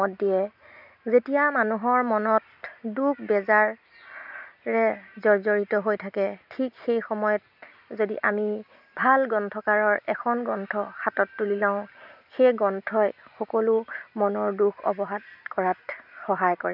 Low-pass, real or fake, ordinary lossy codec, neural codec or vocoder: 5.4 kHz; real; none; none